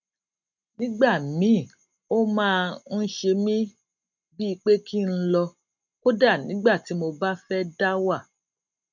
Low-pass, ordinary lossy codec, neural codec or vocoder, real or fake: 7.2 kHz; none; none; real